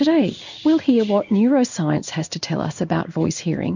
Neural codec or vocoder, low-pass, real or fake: codec, 16 kHz in and 24 kHz out, 1 kbps, XY-Tokenizer; 7.2 kHz; fake